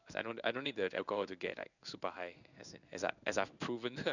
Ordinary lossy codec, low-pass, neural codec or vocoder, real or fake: none; 7.2 kHz; codec, 16 kHz in and 24 kHz out, 1 kbps, XY-Tokenizer; fake